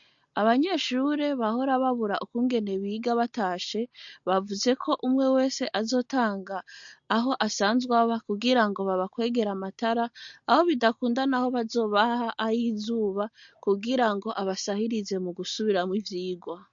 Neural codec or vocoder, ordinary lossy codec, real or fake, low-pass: none; MP3, 48 kbps; real; 7.2 kHz